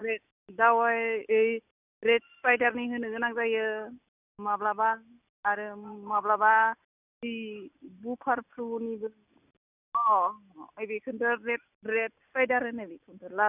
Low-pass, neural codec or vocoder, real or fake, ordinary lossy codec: 3.6 kHz; none; real; none